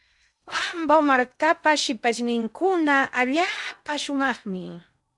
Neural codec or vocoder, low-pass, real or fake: codec, 16 kHz in and 24 kHz out, 0.6 kbps, FocalCodec, streaming, 2048 codes; 10.8 kHz; fake